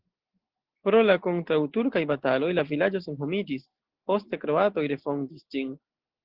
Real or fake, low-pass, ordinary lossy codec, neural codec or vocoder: real; 5.4 kHz; Opus, 16 kbps; none